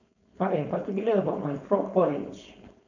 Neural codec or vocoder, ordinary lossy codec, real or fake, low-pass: codec, 16 kHz, 4.8 kbps, FACodec; none; fake; 7.2 kHz